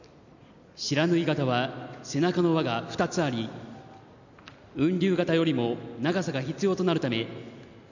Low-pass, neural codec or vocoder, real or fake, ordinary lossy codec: 7.2 kHz; none; real; none